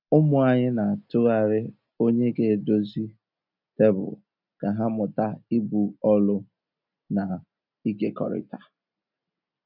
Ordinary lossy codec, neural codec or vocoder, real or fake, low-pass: none; none; real; 5.4 kHz